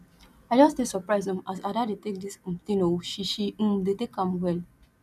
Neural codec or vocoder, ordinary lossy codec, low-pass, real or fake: none; none; 14.4 kHz; real